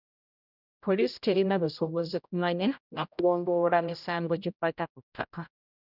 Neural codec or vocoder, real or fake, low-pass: codec, 16 kHz, 0.5 kbps, X-Codec, HuBERT features, trained on general audio; fake; 5.4 kHz